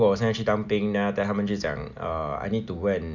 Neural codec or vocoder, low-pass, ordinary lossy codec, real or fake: none; 7.2 kHz; none; real